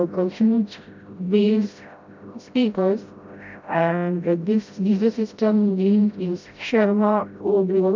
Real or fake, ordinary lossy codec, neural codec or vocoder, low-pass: fake; MP3, 64 kbps; codec, 16 kHz, 0.5 kbps, FreqCodec, smaller model; 7.2 kHz